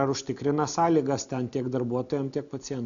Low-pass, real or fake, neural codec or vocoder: 7.2 kHz; real; none